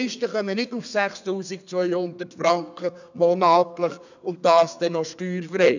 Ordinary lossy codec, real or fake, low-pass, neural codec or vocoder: none; fake; 7.2 kHz; codec, 32 kHz, 1.9 kbps, SNAC